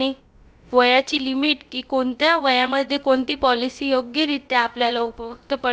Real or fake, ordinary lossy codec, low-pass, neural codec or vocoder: fake; none; none; codec, 16 kHz, about 1 kbps, DyCAST, with the encoder's durations